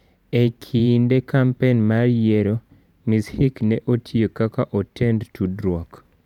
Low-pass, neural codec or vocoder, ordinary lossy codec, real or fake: 19.8 kHz; vocoder, 44.1 kHz, 128 mel bands every 512 samples, BigVGAN v2; none; fake